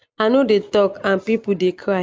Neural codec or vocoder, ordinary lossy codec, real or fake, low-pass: none; none; real; none